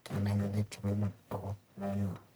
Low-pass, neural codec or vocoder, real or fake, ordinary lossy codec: none; codec, 44.1 kHz, 1.7 kbps, Pupu-Codec; fake; none